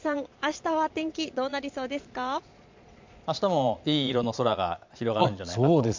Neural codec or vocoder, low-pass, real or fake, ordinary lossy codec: vocoder, 22.05 kHz, 80 mel bands, Vocos; 7.2 kHz; fake; MP3, 64 kbps